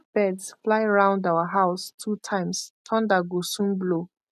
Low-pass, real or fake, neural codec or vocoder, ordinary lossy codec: 14.4 kHz; real; none; none